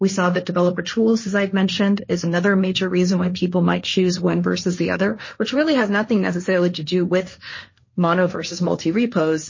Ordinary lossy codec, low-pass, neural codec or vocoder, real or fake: MP3, 32 kbps; 7.2 kHz; codec, 16 kHz, 1.1 kbps, Voila-Tokenizer; fake